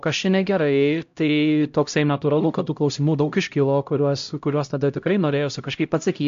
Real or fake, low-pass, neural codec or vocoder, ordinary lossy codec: fake; 7.2 kHz; codec, 16 kHz, 0.5 kbps, X-Codec, HuBERT features, trained on LibriSpeech; AAC, 64 kbps